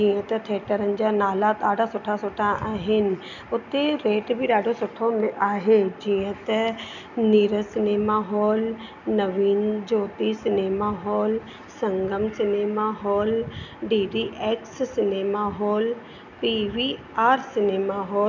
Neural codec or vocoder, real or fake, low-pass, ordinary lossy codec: none; real; 7.2 kHz; none